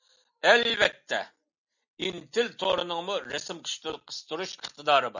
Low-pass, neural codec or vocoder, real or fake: 7.2 kHz; none; real